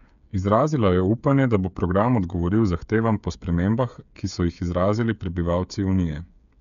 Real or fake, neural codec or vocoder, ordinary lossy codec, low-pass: fake; codec, 16 kHz, 8 kbps, FreqCodec, smaller model; none; 7.2 kHz